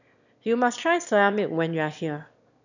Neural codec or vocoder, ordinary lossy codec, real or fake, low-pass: autoencoder, 22.05 kHz, a latent of 192 numbers a frame, VITS, trained on one speaker; none; fake; 7.2 kHz